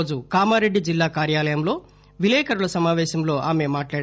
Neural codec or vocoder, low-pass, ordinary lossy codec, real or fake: none; none; none; real